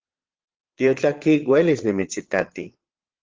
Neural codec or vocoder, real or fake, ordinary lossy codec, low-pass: vocoder, 22.05 kHz, 80 mel bands, Vocos; fake; Opus, 16 kbps; 7.2 kHz